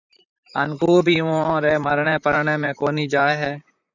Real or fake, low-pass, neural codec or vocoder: fake; 7.2 kHz; vocoder, 44.1 kHz, 128 mel bands, Pupu-Vocoder